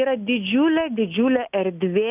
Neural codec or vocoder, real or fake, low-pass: none; real; 3.6 kHz